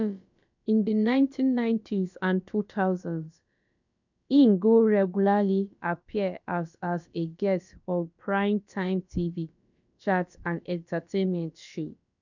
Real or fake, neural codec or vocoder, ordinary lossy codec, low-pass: fake; codec, 16 kHz, about 1 kbps, DyCAST, with the encoder's durations; none; 7.2 kHz